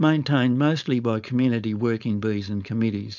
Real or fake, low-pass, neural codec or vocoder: fake; 7.2 kHz; codec, 16 kHz, 4.8 kbps, FACodec